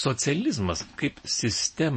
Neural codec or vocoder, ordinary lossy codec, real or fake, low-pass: none; MP3, 32 kbps; real; 9.9 kHz